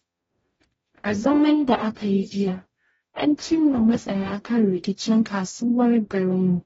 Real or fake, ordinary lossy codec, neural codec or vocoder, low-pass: fake; AAC, 24 kbps; codec, 44.1 kHz, 0.9 kbps, DAC; 19.8 kHz